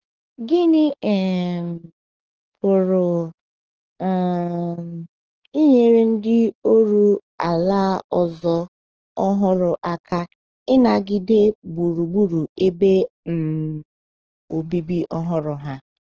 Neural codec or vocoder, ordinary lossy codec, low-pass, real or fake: codec, 44.1 kHz, 7.8 kbps, DAC; Opus, 16 kbps; 7.2 kHz; fake